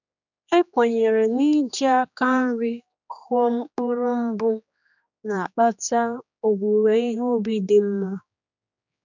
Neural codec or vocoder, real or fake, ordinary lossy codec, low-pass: codec, 16 kHz, 2 kbps, X-Codec, HuBERT features, trained on general audio; fake; none; 7.2 kHz